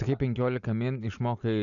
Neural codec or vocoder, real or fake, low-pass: none; real; 7.2 kHz